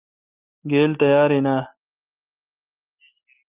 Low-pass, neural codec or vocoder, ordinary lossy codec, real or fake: 3.6 kHz; none; Opus, 24 kbps; real